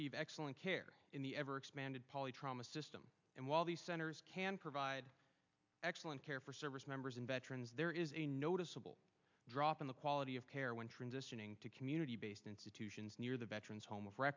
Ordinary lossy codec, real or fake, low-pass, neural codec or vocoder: MP3, 64 kbps; real; 7.2 kHz; none